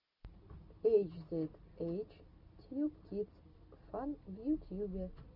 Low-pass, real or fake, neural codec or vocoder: 5.4 kHz; real; none